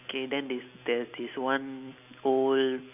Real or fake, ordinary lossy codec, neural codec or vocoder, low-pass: real; none; none; 3.6 kHz